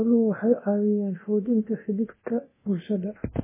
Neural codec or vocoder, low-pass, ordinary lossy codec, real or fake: codec, 24 kHz, 0.9 kbps, DualCodec; 3.6 kHz; MP3, 16 kbps; fake